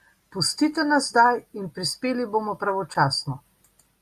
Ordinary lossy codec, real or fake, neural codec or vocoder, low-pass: Opus, 64 kbps; real; none; 14.4 kHz